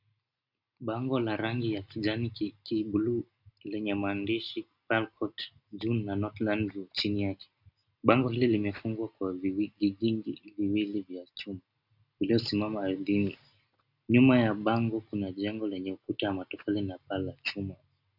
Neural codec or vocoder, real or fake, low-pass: none; real; 5.4 kHz